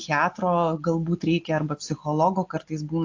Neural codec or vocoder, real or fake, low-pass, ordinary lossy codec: none; real; 7.2 kHz; AAC, 48 kbps